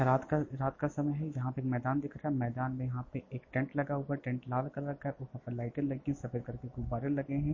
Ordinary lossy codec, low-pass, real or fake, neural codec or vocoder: MP3, 32 kbps; 7.2 kHz; real; none